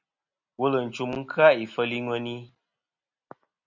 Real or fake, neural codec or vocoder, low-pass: real; none; 7.2 kHz